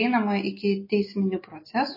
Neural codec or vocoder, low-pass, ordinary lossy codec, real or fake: none; 5.4 kHz; MP3, 24 kbps; real